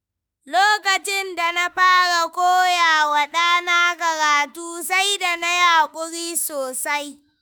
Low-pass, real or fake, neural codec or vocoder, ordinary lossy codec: none; fake; autoencoder, 48 kHz, 32 numbers a frame, DAC-VAE, trained on Japanese speech; none